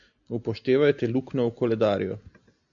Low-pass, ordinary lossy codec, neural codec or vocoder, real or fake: 7.2 kHz; MP3, 96 kbps; none; real